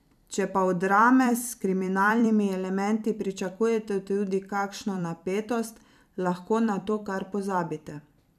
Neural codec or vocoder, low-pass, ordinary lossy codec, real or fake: vocoder, 44.1 kHz, 128 mel bands every 256 samples, BigVGAN v2; 14.4 kHz; none; fake